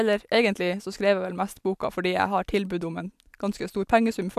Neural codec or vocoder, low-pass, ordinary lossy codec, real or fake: none; 14.4 kHz; AAC, 96 kbps; real